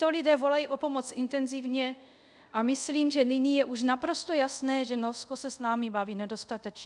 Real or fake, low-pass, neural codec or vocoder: fake; 10.8 kHz; codec, 24 kHz, 0.5 kbps, DualCodec